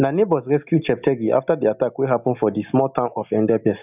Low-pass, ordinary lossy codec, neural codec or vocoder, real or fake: 3.6 kHz; none; none; real